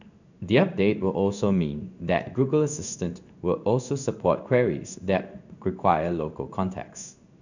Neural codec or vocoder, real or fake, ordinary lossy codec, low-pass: codec, 16 kHz in and 24 kHz out, 1 kbps, XY-Tokenizer; fake; none; 7.2 kHz